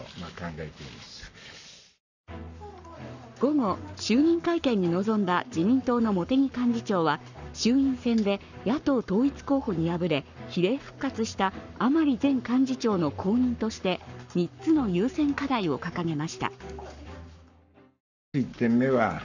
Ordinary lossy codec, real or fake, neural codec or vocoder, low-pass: none; fake; codec, 44.1 kHz, 7.8 kbps, Pupu-Codec; 7.2 kHz